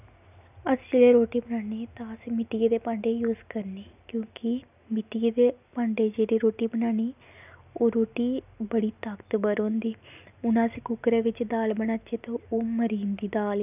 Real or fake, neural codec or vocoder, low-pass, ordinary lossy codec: real; none; 3.6 kHz; none